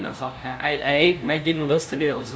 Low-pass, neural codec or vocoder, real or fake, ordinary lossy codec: none; codec, 16 kHz, 0.5 kbps, FunCodec, trained on LibriTTS, 25 frames a second; fake; none